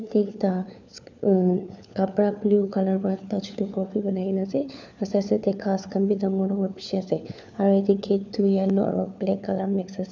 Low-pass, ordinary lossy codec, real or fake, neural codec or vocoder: 7.2 kHz; none; fake; codec, 16 kHz, 4 kbps, FunCodec, trained on LibriTTS, 50 frames a second